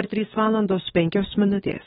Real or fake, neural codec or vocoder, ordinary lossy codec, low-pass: fake; codec, 44.1 kHz, 7.8 kbps, DAC; AAC, 16 kbps; 19.8 kHz